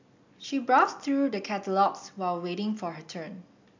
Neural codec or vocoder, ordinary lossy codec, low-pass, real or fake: none; MP3, 64 kbps; 7.2 kHz; real